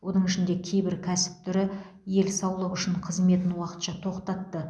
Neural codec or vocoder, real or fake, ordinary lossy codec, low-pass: none; real; none; none